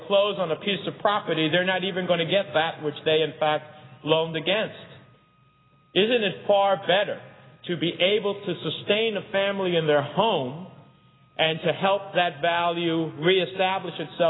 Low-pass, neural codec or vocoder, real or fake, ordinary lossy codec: 7.2 kHz; none; real; AAC, 16 kbps